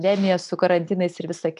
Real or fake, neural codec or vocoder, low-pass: real; none; 10.8 kHz